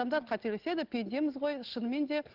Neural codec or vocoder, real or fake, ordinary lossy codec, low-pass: none; real; Opus, 16 kbps; 5.4 kHz